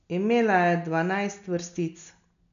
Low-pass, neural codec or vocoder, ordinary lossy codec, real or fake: 7.2 kHz; none; none; real